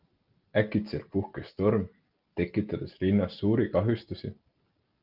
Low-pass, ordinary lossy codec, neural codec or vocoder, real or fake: 5.4 kHz; Opus, 24 kbps; none; real